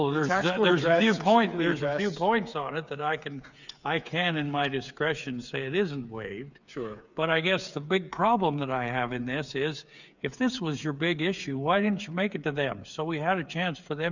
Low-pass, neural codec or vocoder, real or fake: 7.2 kHz; codec, 16 kHz, 8 kbps, FreqCodec, smaller model; fake